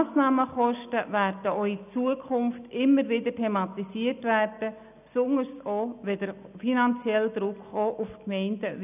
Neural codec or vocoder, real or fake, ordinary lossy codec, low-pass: none; real; none; 3.6 kHz